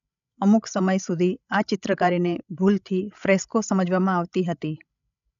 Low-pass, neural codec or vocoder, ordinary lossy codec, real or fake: 7.2 kHz; codec, 16 kHz, 16 kbps, FreqCodec, larger model; none; fake